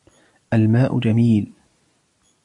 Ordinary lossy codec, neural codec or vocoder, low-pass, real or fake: AAC, 64 kbps; none; 10.8 kHz; real